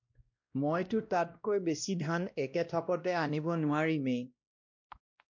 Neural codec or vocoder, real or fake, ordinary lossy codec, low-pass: codec, 16 kHz, 1 kbps, X-Codec, WavLM features, trained on Multilingual LibriSpeech; fake; MP3, 48 kbps; 7.2 kHz